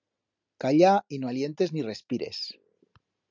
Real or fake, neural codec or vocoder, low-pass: real; none; 7.2 kHz